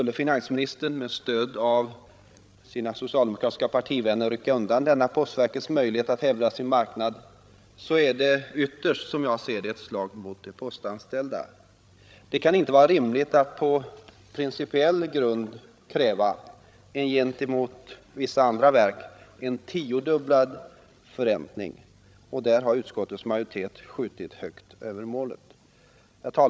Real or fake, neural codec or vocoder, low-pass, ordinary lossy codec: fake; codec, 16 kHz, 16 kbps, FreqCodec, larger model; none; none